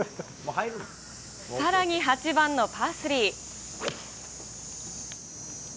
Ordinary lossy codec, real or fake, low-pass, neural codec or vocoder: none; real; none; none